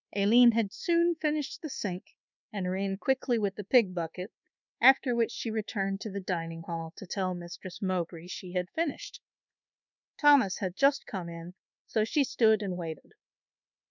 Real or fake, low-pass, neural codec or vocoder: fake; 7.2 kHz; codec, 24 kHz, 1.2 kbps, DualCodec